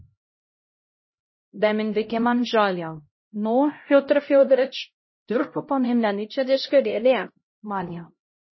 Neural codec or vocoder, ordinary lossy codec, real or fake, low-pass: codec, 16 kHz, 0.5 kbps, X-Codec, HuBERT features, trained on LibriSpeech; MP3, 24 kbps; fake; 7.2 kHz